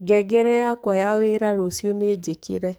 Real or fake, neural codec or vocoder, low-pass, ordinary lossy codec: fake; codec, 44.1 kHz, 2.6 kbps, SNAC; none; none